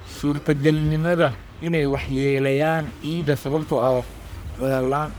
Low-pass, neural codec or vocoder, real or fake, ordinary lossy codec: none; codec, 44.1 kHz, 1.7 kbps, Pupu-Codec; fake; none